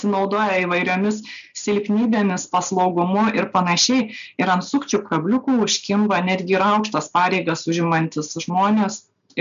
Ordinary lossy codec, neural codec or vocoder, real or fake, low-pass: MP3, 64 kbps; none; real; 7.2 kHz